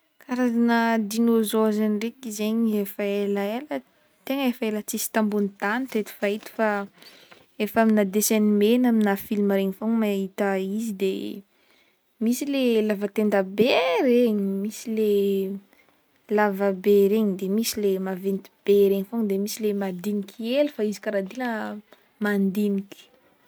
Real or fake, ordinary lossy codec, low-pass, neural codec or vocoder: real; none; none; none